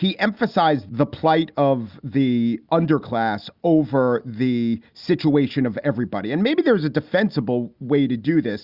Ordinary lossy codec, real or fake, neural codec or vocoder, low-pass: Opus, 64 kbps; real; none; 5.4 kHz